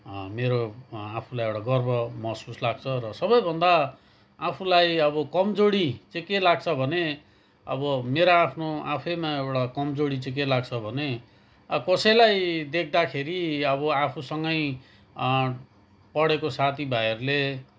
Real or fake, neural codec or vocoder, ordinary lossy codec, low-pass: real; none; none; none